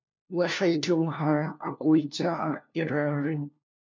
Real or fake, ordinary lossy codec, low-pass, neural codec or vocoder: fake; MP3, 64 kbps; 7.2 kHz; codec, 16 kHz, 1 kbps, FunCodec, trained on LibriTTS, 50 frames a second